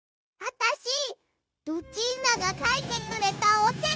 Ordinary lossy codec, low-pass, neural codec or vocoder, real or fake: none; none; codec, 16 kHz, 0.9 kbps, LongCat-Audio-Codec; fake